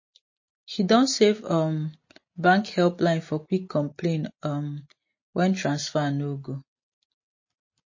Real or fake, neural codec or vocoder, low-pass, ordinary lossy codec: real; none; 7.2 kHz; MP3, 32 kbps